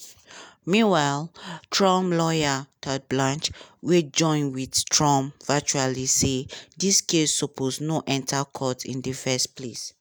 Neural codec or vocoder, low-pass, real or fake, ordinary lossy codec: none; none; real; none